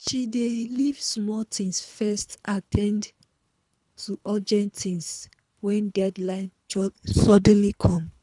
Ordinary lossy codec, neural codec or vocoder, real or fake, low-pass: none; codec, 24 kHz, 3 kbps, HILCodec; fake; 10.8 kHz